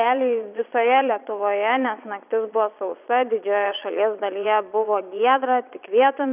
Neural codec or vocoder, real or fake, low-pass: vocoder, 22.05 kHz, 80 mel bands, Vocos; fake; 3.6 kHz